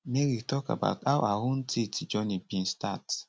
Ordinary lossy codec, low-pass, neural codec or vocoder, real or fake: none; none; none; real